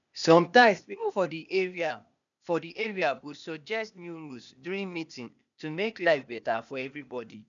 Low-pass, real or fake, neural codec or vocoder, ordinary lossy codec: 7.2 kHz; fake; codec, 16 kHz, 0.8 kbps, ZipCodec; none